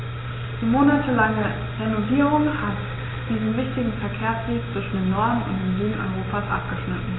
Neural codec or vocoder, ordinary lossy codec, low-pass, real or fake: none; AAC, 16 kbps; 7.2 kHz; real